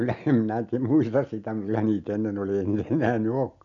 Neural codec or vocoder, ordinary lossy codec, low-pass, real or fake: none; MP3, 64 kbps; 7.2 kHz; real